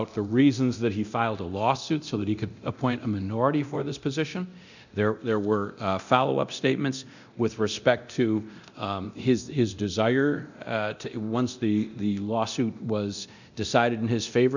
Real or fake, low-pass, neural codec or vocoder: fake; 7.2 kHz; codec, 24 kHz, 0.9 kbps, DualCodec